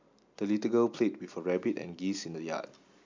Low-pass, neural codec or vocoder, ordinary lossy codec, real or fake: 7.2 kHz; none; MP3, 64 kbps; real